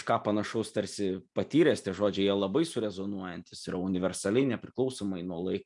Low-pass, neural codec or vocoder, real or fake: 10.8 kHz; none; real